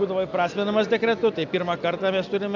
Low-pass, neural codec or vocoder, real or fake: 7.2 kHz; none; real